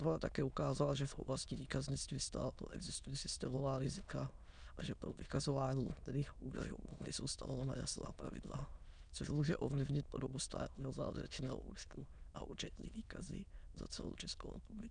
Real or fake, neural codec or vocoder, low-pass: fake; autoencoder, 22.05 kHz, a latent of 192 numbers a frame, VITS, trained on many speakers; 9.9 kHz